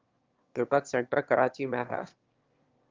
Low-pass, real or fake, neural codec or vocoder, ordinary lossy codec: 7.2 kHz; fake; autoencoder, 22.05 kHz, a latent of 192 numbers a frame, VITS, trained on one speaker; Opus, 32 kbps